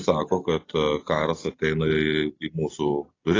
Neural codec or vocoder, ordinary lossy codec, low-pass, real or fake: none; AAC, 32 kbps; 7.2 kHz; real